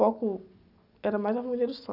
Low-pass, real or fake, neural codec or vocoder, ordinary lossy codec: 5.4 kHz; real; none; none